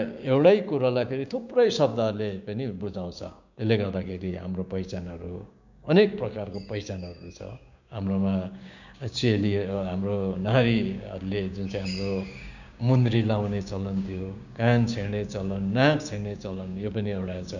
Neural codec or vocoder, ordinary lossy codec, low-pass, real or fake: codec, 16 kHz, 6 kbps, DAC; none; 7.2 kHz; fake